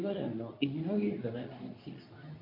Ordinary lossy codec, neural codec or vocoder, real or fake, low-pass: MP3, 32 kbps; codec, 24 kHz, 0.9 kbps, WavTokenizer, medium speech release version 2; fake; 5.4 kHz